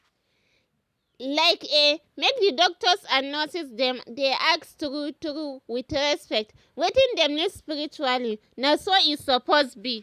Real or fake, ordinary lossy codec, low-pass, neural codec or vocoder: real; none; 14.4 kHz; none